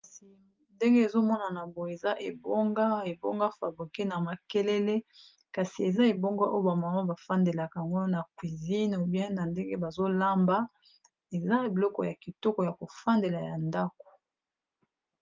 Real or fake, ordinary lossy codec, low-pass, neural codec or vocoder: real; Opus, 24 kbps; 7.2 kHz; none